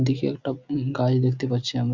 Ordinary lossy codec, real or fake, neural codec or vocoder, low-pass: Opus, 64 kbps; real; none; 7.2 kHz